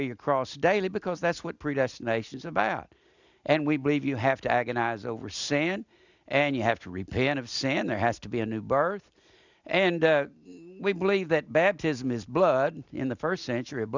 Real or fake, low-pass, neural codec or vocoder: real; 7.2 kHz; none